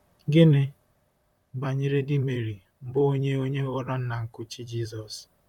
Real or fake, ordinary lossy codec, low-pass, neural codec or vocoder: fake; none; 19.8 kHz; vocoder, 44.1 kHz, 128 mel bands, Pupu-Vocoder